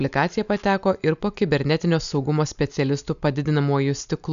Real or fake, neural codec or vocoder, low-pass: real; none; 7.2 kHz